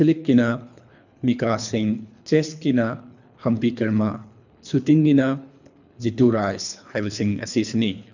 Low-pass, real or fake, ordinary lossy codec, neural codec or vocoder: 7.2 kHz; fake; none; codec, 24 kHz, 3 kbps, HILCodec